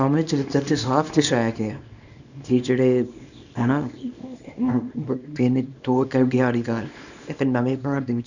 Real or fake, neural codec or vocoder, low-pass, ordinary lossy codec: fake; codec, 24 kHz, 0.9 kbps, WavTokenizer, small release; 7.2 kHz; none